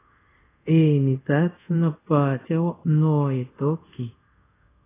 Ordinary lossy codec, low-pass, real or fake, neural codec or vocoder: AAC, 16 kbps; 3.6 kHz; fake; codec, 24 kHz, 0.5 kbps, DualCodec